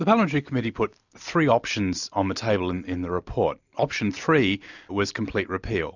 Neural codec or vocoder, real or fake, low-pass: none; real; 7.2 kHz